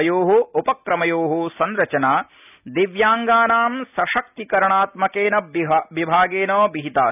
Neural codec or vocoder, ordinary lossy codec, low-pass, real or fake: none; none; 3.6 kHz; real